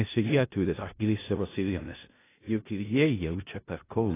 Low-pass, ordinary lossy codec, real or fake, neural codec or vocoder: 3.6 kHz; AAC, 16 kbps; fake; codec, 16 kHz in and 24 kHz out, 0.4 kbps, LongCat-Audio-Codec, four codebook decoder